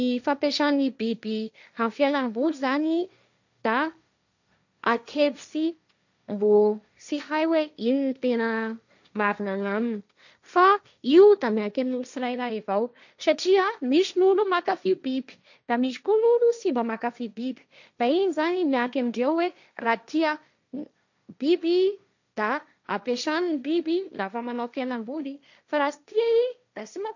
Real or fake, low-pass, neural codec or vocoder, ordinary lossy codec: fake; 7.2 kHz; codec, 16 kHz, 1.1 kbps, Voila-Tokenizer; none